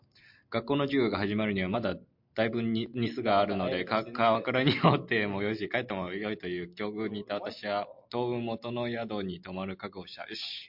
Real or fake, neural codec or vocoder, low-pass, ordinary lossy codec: real; none; 5.4 kHz; MP3, 48 kbps